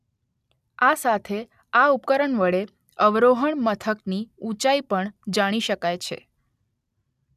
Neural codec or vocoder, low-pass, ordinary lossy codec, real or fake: none; 14.4 kHz; none; real